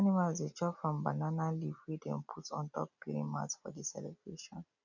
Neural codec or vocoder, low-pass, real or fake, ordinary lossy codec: none; 7.2 kHz; real; none